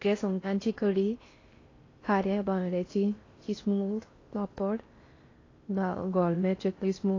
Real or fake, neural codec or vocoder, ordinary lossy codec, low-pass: fake; codec, 16 kHz in and 24 kHz out, 0.6 kbps, FocalCodec, streaming, 4096 codes; AAC, 32 kbps; 7.2 kHz